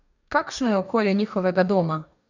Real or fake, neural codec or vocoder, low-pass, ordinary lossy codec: fake; codec, 44.1 kHz, 2.6 kbps, DAC; 7.2 kHz; none